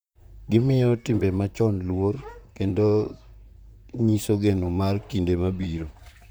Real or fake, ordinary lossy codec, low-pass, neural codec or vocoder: fake; none; none; vocoder, 44.1 kHz, 128 mel bands, Pupu-Vocoder